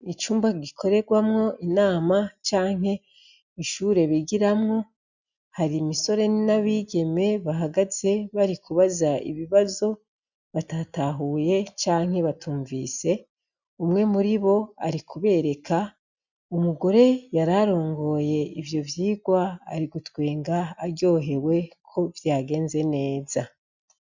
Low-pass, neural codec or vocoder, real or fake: 7.2 kHz; none; real